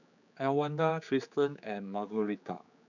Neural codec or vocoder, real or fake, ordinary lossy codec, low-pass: codec, 16 kHz, 4 kbps, X-Codec, HuBERT features, trained on general audio; fake; none; 7.2 kHz